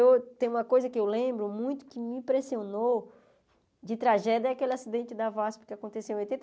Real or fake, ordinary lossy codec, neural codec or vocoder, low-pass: real; none; none; none